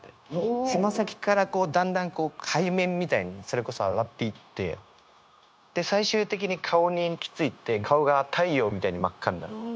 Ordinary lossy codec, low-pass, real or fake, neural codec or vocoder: none; none; fake; codec, 16 kHz, 0.9 kbps, LongCat-Audio-Codec